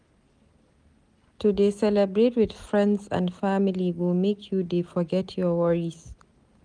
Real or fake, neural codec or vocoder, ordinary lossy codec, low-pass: real; none; Opus, 32 kbps; 9.9 kHz